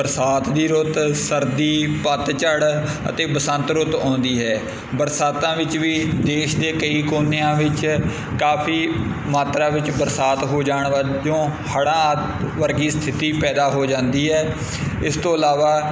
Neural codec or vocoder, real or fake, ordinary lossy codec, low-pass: none; real; none; none